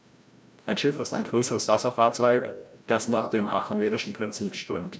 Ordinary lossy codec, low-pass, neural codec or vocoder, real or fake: none; none; codec, 16 kHz, 0.5 kbps, FreqCodec, larger model; fake